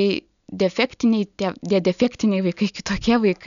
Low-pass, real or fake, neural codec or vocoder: 7.2 kHz; real; none